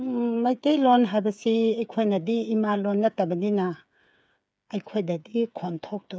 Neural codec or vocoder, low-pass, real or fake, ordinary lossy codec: codec, 16 kHz, 8 kbps, FreqCodec, smaller model; none; fake; none